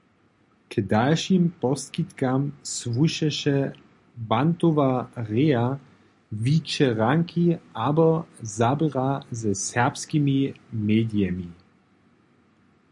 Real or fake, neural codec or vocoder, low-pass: real; none; 10.8 kHz